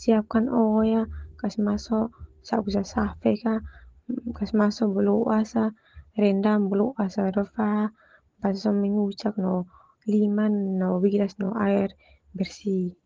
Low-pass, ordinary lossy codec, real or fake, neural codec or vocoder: 7.2 kHz; Opus, 16 kbps; real; none